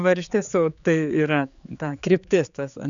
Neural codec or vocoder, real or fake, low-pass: codec, 16 kHz, 4 kbps, X-Codec, HuBERT features, trained on general audio; fake; 7.2 kHz